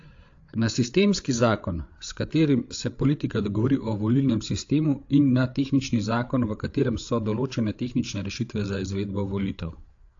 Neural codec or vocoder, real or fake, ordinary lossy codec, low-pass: codec, 16 kHz, 8 kbps, FreqCodec, larger model; fake; AAC, 48 kbps; 7.2 kHz